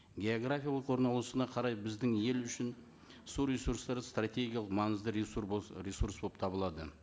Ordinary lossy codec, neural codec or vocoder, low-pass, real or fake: none; none; none; real